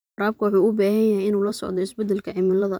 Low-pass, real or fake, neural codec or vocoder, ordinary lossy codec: none; fake; vocoder, 44.1 kHz, 128 mel bands every 512 samples, BigVGAN v2; none